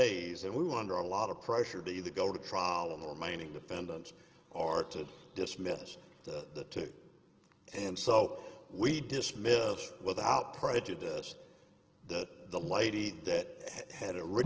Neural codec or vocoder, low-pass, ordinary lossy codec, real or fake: none; 7.2 kHz; Opus, 16 kbps; real